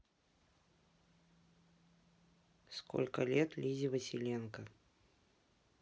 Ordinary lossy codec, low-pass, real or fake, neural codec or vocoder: none; none; real; none